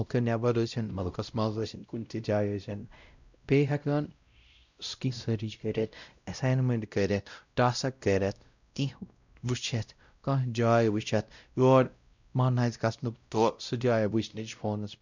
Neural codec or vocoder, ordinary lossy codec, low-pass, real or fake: codec, 16 kHz, 0.5 kbps, X-Codec, WavLM features, trained on Multilingual LibriSpeech; none; 7.2 kHz; fake